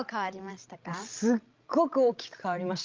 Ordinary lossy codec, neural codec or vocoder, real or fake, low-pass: Opus, 32 kbps; codec, 16 kHz, 16 kbps, FreqCodec, larger model; fake; 7.2 kHz